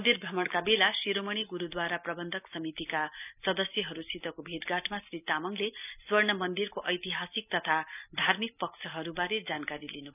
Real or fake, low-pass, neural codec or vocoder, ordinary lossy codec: real; 3.6 kHz; none; none